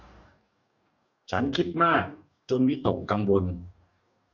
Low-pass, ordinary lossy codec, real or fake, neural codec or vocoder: 7.2 kHz; none; fake; codec, 44.1 kHz, 2.6 kbps, DAC